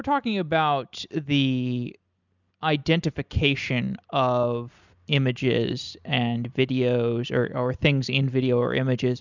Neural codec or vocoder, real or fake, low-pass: none; real; 7.2 kHz